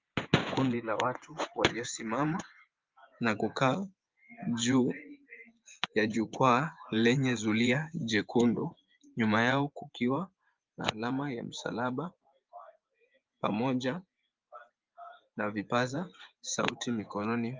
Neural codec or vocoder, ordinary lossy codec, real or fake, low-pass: vocoder, 24 kHz, 100 mel bands, Vocos; Opus, 32 kbps; fake; 7.2 kHz